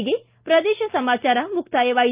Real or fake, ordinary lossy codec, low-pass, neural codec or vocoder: real; Opus, 24 kbps; 3.6 kHz; none